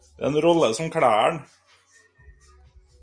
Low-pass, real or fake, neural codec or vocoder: 9.9 kHz; real; none